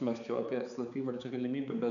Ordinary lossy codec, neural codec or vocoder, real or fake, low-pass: MP3, 96 kbps; codec, 16 kHz, 4 kbps, X-Codec, HuBERT features, trained on balanced general audio; fake; 7.2 kHz